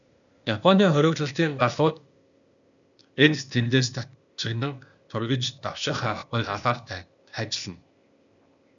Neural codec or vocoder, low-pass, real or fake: codec, 16 kHz, 0.8 kbps, ZipCodec; 7.2 kHz; fake